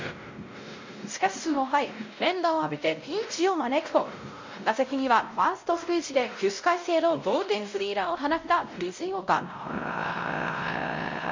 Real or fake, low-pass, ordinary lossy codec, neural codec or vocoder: fake; 7.2 kHz; MP3, 48 kbps; codec, 16 kHz, 0.5 kbps, X-Codec, WavLM features, trained on Multilingual LibriSpeech